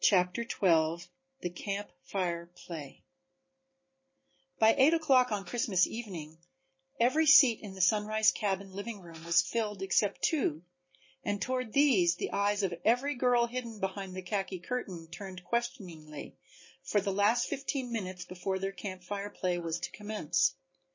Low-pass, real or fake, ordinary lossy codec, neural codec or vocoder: 7.2 kHz; real; MP3, 32 kbps; none